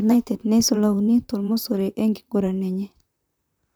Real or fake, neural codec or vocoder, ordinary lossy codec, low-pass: fake; vocoder, 44.1 kHz, 128 mel bands, Pupu-Vocoder; none; none